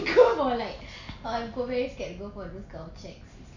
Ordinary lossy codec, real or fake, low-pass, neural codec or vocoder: none; real; 7.2 kHz; none